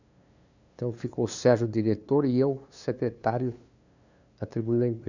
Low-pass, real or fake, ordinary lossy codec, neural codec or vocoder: 7.2 kHz; fake; none; codec, 16 kHz, 2 kbps, FunCodec, trained on LibriTTS, 25 frames a second